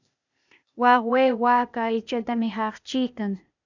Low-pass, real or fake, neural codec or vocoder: 7.2 kHz; fake; codec, 16 kHz, 0.8 kbps, ZipCodec